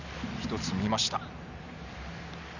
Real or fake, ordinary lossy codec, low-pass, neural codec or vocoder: real; none; 7.2 kHz; none